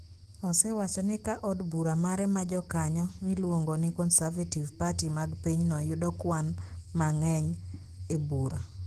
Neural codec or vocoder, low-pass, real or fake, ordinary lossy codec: autoencoder, 48 kHz, 128 numbers a frame, DAC-VAE, trained on Japanese speech; 14.4 kHz; fake; Opus, 16 kbps